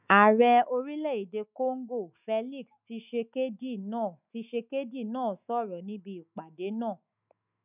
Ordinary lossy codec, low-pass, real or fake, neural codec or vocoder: none; 3.6 kHz; fake; autoencoder, 48 kHz, 128 numbers a frame, DAC-VAE, trained on Japanese speech